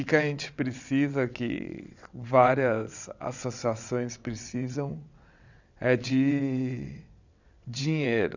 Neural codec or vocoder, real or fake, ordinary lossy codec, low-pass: vocoder, 22.05 kHz, 80 mel bands, WaveNeXt; fake; none; 7.2 kHz